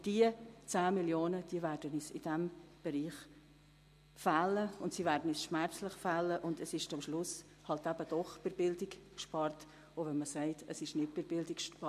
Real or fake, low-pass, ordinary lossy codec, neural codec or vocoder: real; 14.4 kHz; MP3, 64 kbps; none